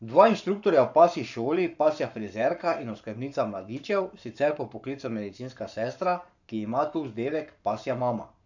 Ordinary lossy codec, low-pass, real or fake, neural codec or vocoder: none; 7.2 kHz; fake; codec, 44.1 kHz, 7.8 kbps, Pupu-Codec